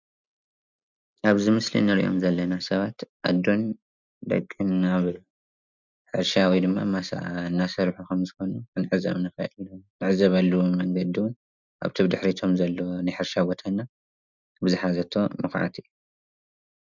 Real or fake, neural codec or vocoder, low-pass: real; none; 7.2 kHz